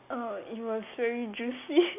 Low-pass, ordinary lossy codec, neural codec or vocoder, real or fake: 3.6 kHz; AAC, 32 kbps; none; real